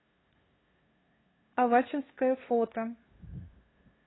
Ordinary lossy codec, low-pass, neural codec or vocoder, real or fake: AAC, 16 kbps; 7.2 kHz; codec, 16 kHz, 2 kbps, FunCodec, trained on LibriTTS, 25 frames a second; fake